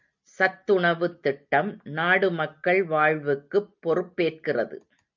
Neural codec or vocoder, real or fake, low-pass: none; real; 7.2 kHz